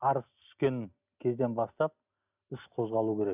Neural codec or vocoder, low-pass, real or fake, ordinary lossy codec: none; 3.6 kHz; real; none